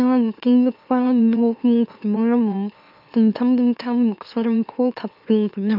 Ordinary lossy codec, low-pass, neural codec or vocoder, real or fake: none; 5.4 kHz; autoencoder, 44.1 kHz, a latent of 192 numbers a frame, MeloTTS; fake